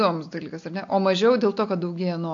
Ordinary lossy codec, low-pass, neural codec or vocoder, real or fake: AAC, 48 kbps; 7.2 kHz; none; real